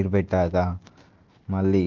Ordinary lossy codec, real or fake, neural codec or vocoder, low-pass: Opus, 16 kbps; real; none; 7.2 kHz